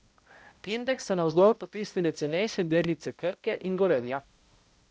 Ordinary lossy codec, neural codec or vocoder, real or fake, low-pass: none; codec, 16 kHz, 0.5 kbps, X-Codec, HuBERT features, trained on balanced general audio; fake; none